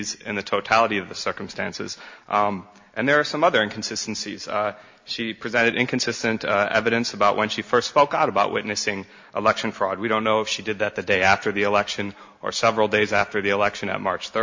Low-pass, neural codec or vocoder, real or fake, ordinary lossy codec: 7.2 kHz; none; real; MP3, 48 kbps